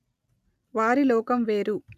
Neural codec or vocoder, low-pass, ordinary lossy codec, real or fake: none; 14.4 kHz; none; real